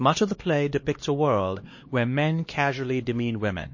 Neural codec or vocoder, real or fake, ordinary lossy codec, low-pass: codec, 16 kHz, 2 kbps, X-Codec, HuBERT features, trained on LibriSpeech; fake; MP3, 32 kbps; 7.2 kHz